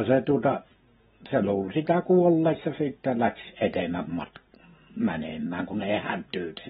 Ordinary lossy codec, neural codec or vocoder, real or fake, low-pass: AAC, 16 kbps; none; real; 19.8 kHz